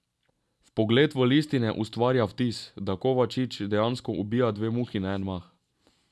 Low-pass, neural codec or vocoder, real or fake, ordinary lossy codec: none; none; real; none